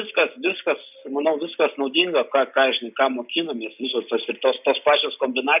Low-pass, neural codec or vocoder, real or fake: 3.6 kHz; none; real